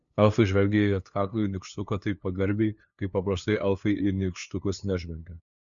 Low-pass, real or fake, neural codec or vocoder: 7.2 kHz; fake; codec, 16 kHz, 2 kbps, FunCodec, trained on LibriTTS, 25 frames a second